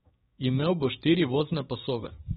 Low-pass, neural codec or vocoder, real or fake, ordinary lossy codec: 7.2 kHz; codec, 16 kHz, 6 kbps, DAC; fake; AAC, 16 kbps